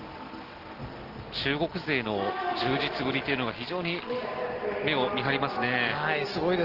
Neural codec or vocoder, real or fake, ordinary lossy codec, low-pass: none; real; Opus, 16 kbps; 5.4 kHz